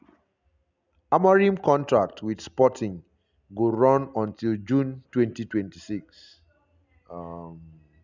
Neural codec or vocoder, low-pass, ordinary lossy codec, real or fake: none; 7.2 kHz; none; real